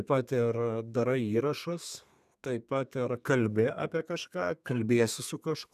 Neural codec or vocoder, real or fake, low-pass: codec, 32 kHz, 1.9 kbps, SNAC; fake; 14.4 kHz